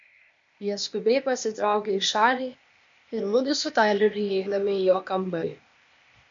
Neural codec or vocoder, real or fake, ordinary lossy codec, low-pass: codec, 16 kHz, 0.8 kbps, ZipCodec; fake; MP3, 48 kbps; 7.2 kHz